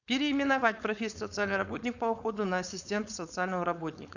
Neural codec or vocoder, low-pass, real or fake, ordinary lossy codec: codec, 16 kHz, 4.8 kbps, FACodec; 7.2 kHz; fake; none